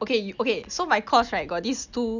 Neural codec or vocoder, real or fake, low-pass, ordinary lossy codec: none; real; 7.2 kHz; none